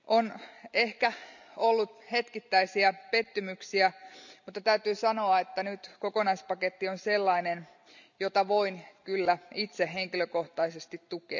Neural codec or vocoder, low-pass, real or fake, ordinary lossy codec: none; 7.2 kHz; real; none